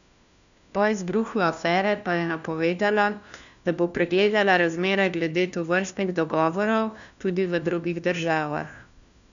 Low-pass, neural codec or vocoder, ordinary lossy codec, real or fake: 7.2 kHz; codec, 16 kHz, 1 kbps, FunCodec, trained on LibriTTS, 50 frames a second; none; fake